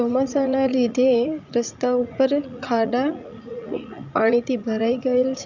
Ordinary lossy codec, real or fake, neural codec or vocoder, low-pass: none; fake; vocoder, 44.1 kHz, 80 mel bands, Vocos; 7.2 kHz